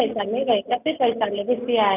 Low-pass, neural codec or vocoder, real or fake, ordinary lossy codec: 3.6 kHz; none; real; none